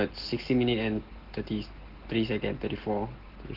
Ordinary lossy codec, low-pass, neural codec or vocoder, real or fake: Opus, 16 kbps; 5.4 kHz; none; real